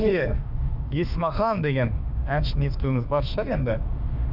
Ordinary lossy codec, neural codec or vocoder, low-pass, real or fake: none; autoencoder, 48 kHz, 32 numbers a frame, DAC-VAE, trained on Japanese speech; 5.4 kHz; fake